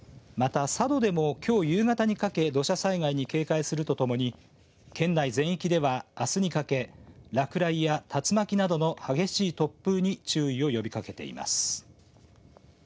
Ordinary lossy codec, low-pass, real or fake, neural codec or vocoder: none; none; real; none